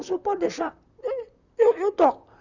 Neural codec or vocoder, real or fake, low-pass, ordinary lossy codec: vocoder, 44.1 kHz, 128 mel bands, Pupu-Vocoder; fake; 7.2 kHz; Opus, 64 kbps